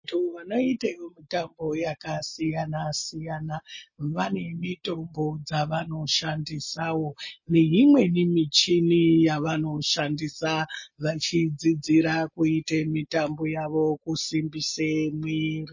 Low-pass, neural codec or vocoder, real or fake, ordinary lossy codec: 7.2 kHz; none; real; MP3, 32 kbps